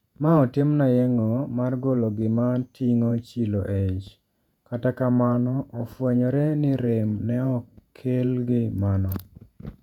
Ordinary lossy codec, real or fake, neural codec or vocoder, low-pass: none; fake; vocoder, 48 kHz, 128 mel bands, Vocos; 19.8 kHz